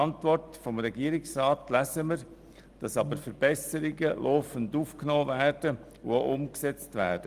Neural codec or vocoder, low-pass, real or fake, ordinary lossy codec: none; 14.4 kHz; real; Opus, 24 kbps